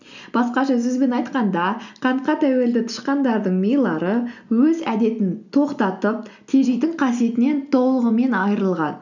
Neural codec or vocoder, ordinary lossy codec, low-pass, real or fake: none; none; 7.2 kHz; real